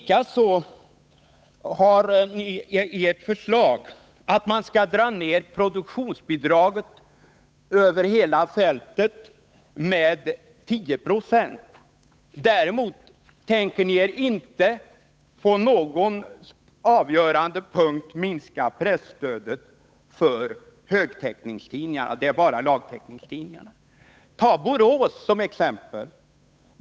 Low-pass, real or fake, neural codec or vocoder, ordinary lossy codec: none; fake; codec, 16 kHz, 8 kbps, FunCodec, trained on Chinese and English, 25 frames a second; none